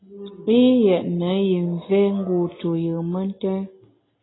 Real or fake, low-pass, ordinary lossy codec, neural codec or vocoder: real; 7.2 kHz; AAC, 16 kbps; none